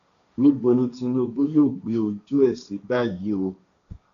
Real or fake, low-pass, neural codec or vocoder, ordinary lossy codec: fake; 7.2 kHz; codec, 16 kHz, 1.1 kbps, Voila-Tokenizer; none